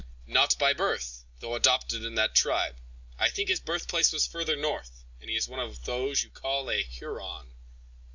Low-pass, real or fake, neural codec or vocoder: 7.2 kHz; real; none